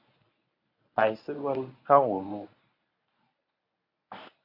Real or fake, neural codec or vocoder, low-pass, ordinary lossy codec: fake; codec, 24 kHz, 0.9 kbps, WavTokenizer, medium speech release version 1; 5.4 kHz; AAC, 32 kbps